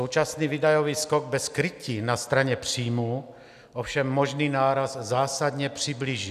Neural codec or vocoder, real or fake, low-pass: none; real; 14.4 kHz